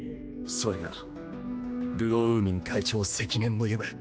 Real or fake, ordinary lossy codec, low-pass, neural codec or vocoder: fake; none; none; codec, 16 kHz, 2 kbps, X-Codec, HuBERT features, trained on general audio